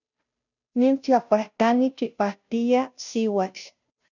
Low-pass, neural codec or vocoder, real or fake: 7.2 kHz; codec, 16 kHz, 0.5 kbps, FunCodec, trained on Chinese and English, 25 frames a second; fake